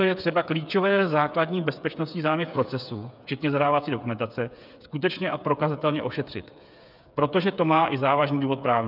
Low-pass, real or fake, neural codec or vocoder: 5.4 kHz; fake; codec, 16 kHz, 8 kbps, FreqCodec, smaller model